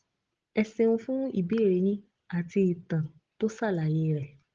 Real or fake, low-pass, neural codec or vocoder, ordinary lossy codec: real; 7.2 kHz; none; Opus, 16 kbps